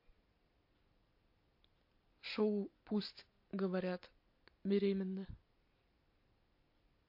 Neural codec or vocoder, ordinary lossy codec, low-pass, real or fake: none; MP3, 32 kbps; 5.4 kHz; real